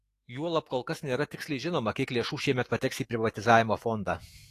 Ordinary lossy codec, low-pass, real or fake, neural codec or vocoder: AAC, 48 kbps; 14.4 kHz; fake; autoencoder, 48 kHz, 128 numbers a frame, DAC-VAE, trained on Japanese speech